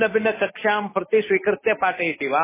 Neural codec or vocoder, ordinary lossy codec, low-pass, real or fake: none; MP3, 16 kbps; 3.6 kHz; real